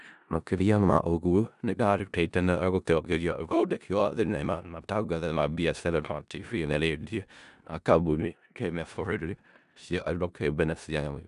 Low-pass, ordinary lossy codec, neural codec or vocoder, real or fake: 10.8 kHz; none; codec, 16 kHz in and 24 kHz out, 0.4 kbps, LongCat-Audio-Codec, four codebook decoder; fake